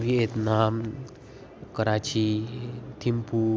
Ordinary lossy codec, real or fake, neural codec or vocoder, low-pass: none; real; none; none